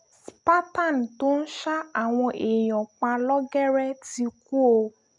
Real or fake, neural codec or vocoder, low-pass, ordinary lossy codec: real; none; 10.8 kHz; none